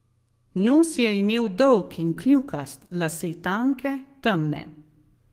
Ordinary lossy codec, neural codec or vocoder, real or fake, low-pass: Opus, 24 kbps; codec, 32 kHz, 1.9 kbps, SNAC; fake; 14.4 kHz